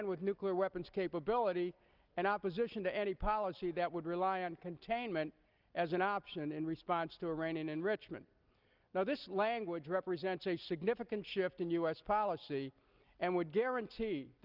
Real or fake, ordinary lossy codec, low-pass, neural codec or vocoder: real; Opus, 24 kbps; 5.4 kHz; none